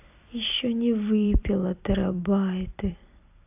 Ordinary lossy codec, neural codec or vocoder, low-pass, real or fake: AAC, 32 kbps; vocoder, 44.1 kHz, 128 mel bands every 256 samples, BigVGAN v2; 3.6 kHz; fake